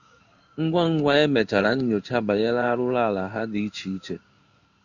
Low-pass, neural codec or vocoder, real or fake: 7.2 kHz; codec, 16 kHz in and 24 kHz out, 1 kbps, XY-Tokenizer; fake